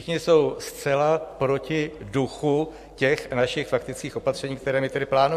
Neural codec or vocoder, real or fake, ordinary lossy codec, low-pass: vocoder, 44.1 kHz, 128 mel bands every 512 samples, BigVGAN v2; fake; MP3, 64 kbps; 14.4 kHz